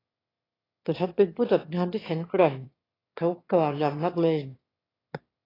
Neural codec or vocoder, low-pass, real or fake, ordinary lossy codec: autoencoder, 22.05 kHz, a latent of 192 numbers a frame, VITS, trained on one speaker; 5.4 kHz; fake; AAC, 24 kbps